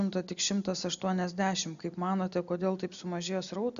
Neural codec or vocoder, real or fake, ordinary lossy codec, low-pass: none; real; AAC, 96 kbps; 7.2 kHz